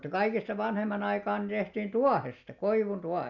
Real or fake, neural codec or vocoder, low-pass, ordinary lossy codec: real; none; 7.2 kHz; none